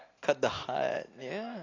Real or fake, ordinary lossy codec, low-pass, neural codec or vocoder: real; AAC, 32 kbps; 7.2 kHz; none